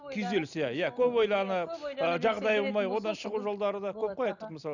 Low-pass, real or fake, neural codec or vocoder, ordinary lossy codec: 7.2 kHz; real; none; none